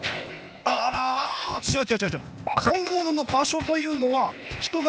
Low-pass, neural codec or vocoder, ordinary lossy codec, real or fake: none; codec, 16 kHz, 0.8 kbps, ZipCodec; none; fake